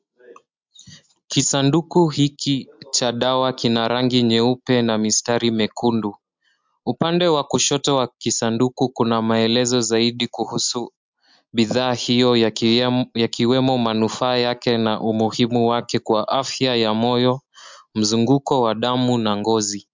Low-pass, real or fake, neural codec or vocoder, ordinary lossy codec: 7.2 kHz; real; none; MP3, 64 kbps